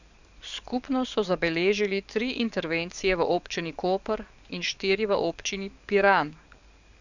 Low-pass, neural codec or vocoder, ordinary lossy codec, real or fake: 7.2 kHz; codec, 44.1 kHz, 7.8 kbps, DAC; none; fake